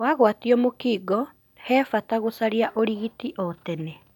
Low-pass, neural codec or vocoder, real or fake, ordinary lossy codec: 19.8 kHz; none; real; none